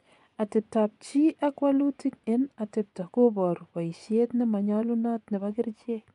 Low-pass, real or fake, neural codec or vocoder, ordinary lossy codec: 10.8 kHz; fake; vocoder, 24 kHz, 100 mel bands, Vocos; none